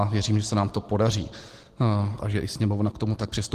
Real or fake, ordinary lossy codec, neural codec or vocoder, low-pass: real; Opus, 16 kbps; none; 14.4 kHz